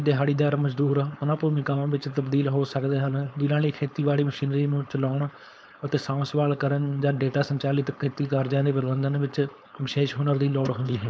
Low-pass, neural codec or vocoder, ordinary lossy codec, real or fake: none; codec, 16 kHz, 4.8 kbps, FACodec; none; fake